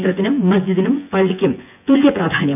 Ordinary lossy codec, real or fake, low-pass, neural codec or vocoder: none; fake; 3.6 kHz; vocoder, 24 kHz, 100 mel bands, Vocos